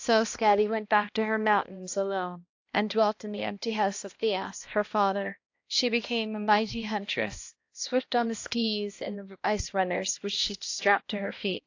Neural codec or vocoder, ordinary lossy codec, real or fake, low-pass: codec, 16 kHz, 1 kbps, X-Codec, HuBERT features, trained on balanced general audio; AAC, 48 kbps; fake; 7.2 kHz